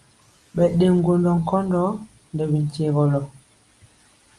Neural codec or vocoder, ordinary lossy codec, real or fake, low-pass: none; Opus, 32 kbps; real; 10.8 kHz